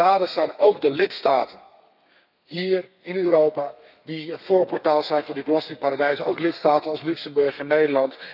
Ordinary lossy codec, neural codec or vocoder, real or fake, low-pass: none; codec, 32 kHz, 1.9 kbps, SNAC; fake; 5.4 kHz